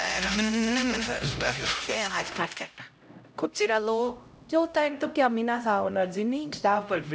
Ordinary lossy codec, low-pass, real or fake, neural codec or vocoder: none; none; fake; codec, 16 kHz, 0.5 kbps, X-Codec, HuBERT features, trained on LibriSpeech